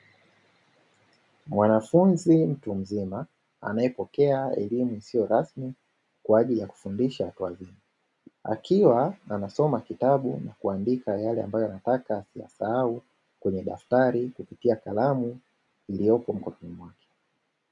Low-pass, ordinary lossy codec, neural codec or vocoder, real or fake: 10.8 kHz; MP3, 96 kbps; none; real